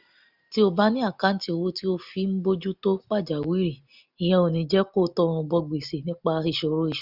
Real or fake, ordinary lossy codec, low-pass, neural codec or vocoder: real; none; 5.4 kHz; none